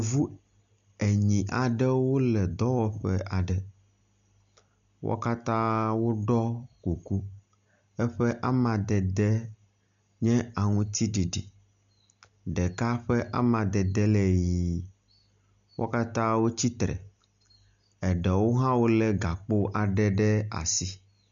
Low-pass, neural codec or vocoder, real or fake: 7.2 kHz; none; real